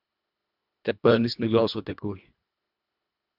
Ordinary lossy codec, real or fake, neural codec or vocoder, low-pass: AAC, 48 kbps; fake; codec, 24 kHz, 1.5 kbps, HILCodec; 5.4 kHz